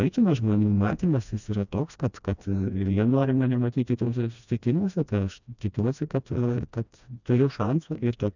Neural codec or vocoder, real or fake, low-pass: codec, 16 kHz, 1 kbps, FreqCodec, smaller model; fake; 7.2 kHz